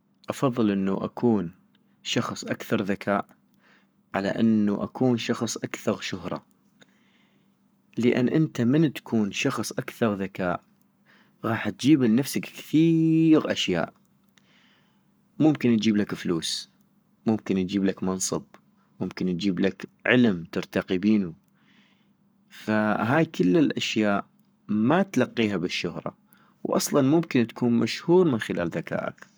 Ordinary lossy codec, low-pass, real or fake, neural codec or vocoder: none; none; fake; codec, 44.1 kHz, 7.8 kbps, Pupu-Codec